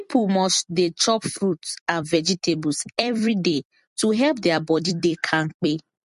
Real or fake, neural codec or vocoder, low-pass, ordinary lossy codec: fake; vocoder, 44.1 kHz, 128 mel bands every 256 samples, BigVGAN v2; 14.4 kHz; MP3, 48 kbps